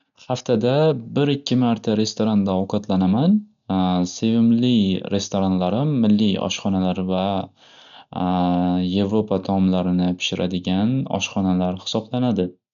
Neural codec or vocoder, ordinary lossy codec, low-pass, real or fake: none; none; 7.2 kHz; real